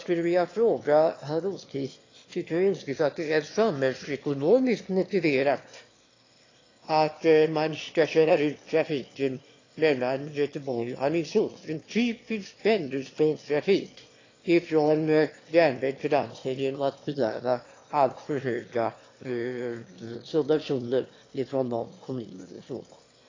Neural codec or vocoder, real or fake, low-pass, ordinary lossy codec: autoencoder, 22.05 kHz, a latent of 192 numbers a frame, VITS, trained on one speaker; fake; 7.2 kHz; AAC, 32 kbps